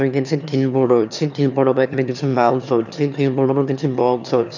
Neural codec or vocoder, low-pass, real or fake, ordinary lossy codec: autoencoder, 22.05 kHz, a latent of 192 numbers a frame, VITS, trained on one speaker; 7.2 kHz; fake; none